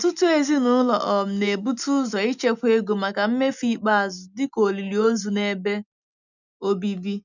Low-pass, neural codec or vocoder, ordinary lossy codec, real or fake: 7.2 kHz; none; none; real